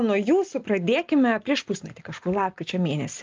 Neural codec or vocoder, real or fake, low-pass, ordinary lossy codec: none; real; 7.2 kHz; Opus, 32 kbps